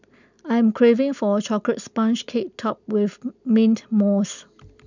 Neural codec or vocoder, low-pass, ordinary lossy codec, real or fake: none; 7.2 kHz; none; real